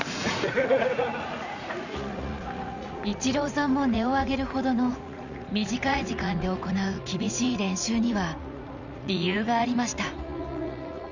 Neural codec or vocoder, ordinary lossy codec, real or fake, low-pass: vocoder, 44.1 kHz, 80 mel bands, Vocos; none; fake; 7.2 kHz